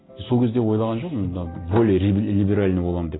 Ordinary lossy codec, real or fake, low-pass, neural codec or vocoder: AAC, 16 kbps; real; 7.2 kHz; none